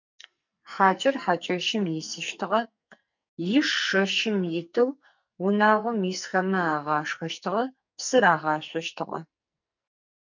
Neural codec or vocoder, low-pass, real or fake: codec, 44.1 kHz, 2.6 kbps, SNAC; 7.2 kHz; fake